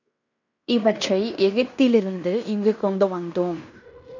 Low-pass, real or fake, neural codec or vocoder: 7.2 kHz; fake; codec, 16 kHz in and 24 kHz out, 0.9 kbps, LongCat-Audio-Codec, fine tuned four codebook decoder